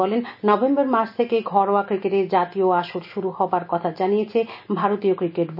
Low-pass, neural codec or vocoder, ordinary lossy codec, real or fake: 5.4 kHz; none; none; real